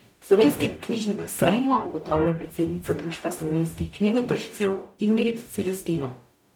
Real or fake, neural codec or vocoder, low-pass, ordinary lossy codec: fake; codec, 44.1 kHz, 0.9 kbps, DAC; 19.8 kHz; none